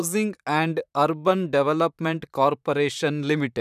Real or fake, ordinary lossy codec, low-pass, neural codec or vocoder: fake; none; 14.4 kHz; vocoder, 44.1 kHz, 128 mel bands, Pupu-Vocoder